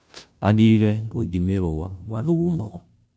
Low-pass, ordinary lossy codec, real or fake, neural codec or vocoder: none; none; fake; codec, 16 kHz, 0.5 kbps, FunCodec, trained on Chinese and English, 25 frames a second